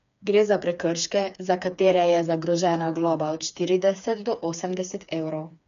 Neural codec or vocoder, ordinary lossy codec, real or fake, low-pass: codec, 16 kHz, 4 kbps, FreqCodec, smaller model; none; fake; 7.2 kHz